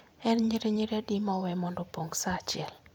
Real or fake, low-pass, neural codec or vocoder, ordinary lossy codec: fake; none; vocoder, 44.1 kHz, 128 mel bands every 256 samples, BigVGAN v2; none